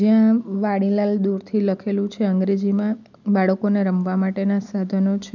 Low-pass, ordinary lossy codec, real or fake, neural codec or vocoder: 7.2 kHz; none; real; none